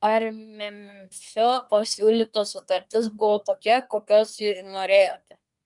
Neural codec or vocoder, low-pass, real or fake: codec, 24 kHz, 1 kbps, SNAC; 10.8 kHz; fake